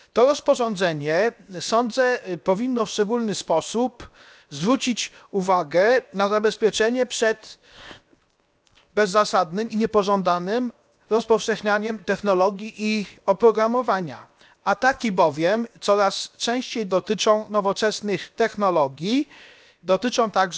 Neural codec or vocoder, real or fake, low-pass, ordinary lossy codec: codec, 16 kHz, 0.7 kbps, FocalCodec; fake; none; none